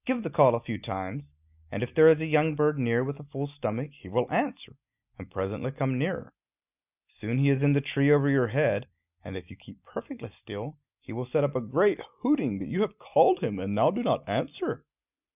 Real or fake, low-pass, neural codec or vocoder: real; 3.6 kHz; none